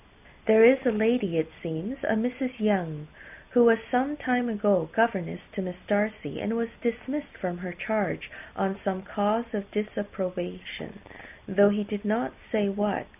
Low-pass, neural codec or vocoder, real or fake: 3.6 kHz; none; real